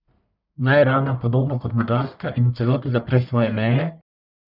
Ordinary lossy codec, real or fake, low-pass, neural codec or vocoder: none; fake; 5.4 kHz; codec, 44.1 kHz, 1.7 kbps, Pupu-Codec